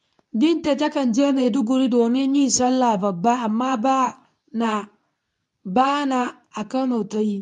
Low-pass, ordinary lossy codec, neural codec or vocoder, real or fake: none; none; codec, 24 kHz, 0.9 kbps, WavTokenizer, medium speech release version 2; fake